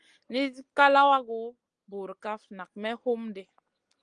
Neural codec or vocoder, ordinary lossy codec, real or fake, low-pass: none; Opus, 24 kbps; real; 9.9 kHz